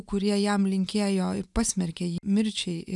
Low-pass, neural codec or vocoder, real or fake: 10.8 kHz; none; real